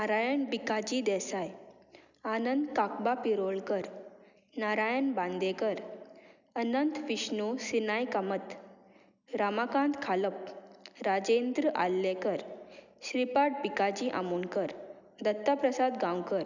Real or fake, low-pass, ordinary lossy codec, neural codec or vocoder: real; 7.2 kHz; none; none